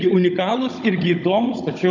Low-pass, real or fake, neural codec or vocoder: 7.2 kHz; fake; codec, 16 kHz, 16 kbps, FunCodec, trained on Chinese and English, 50 frames a second